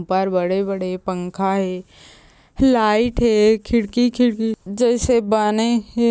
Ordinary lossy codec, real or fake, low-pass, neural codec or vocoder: none; real; none; none